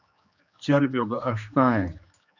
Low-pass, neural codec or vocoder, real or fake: 7.2 kHz; codec, 16 kHz, 2 kbps, X-Codec, HuBERT features, trained on general audio; fake